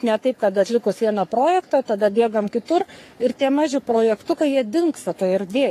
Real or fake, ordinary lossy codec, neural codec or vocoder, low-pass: fake; AAC, 48 kbps; codec, 44.1 kHz, 3.4 kbps, Pupu-Codec; 14.4 kHz